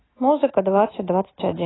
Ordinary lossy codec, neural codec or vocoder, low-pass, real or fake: AAC, 16 kbps; none; 7.2 kHz; real